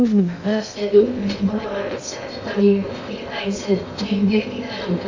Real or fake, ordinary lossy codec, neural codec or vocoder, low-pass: fake; AAC, 48 kbps; codec, 16 kHz in and 24 kHz out, 0.6 kbps, FocalCodec, streaming, 2048 codes; 7.2 kHz